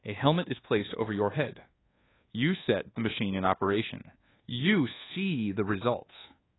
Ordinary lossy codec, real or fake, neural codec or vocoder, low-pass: AAC, 16 kbps; fake; codec, 24 kHz, 1.2 kbps, DualCodec; 7.2 kHz